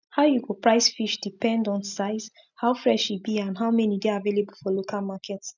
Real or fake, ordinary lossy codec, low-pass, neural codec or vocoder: real; none; 7.2 kHz; none